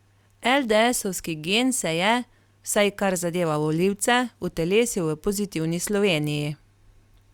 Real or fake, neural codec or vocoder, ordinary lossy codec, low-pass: real; none; Opus, 64 kbps; 19.8 kHz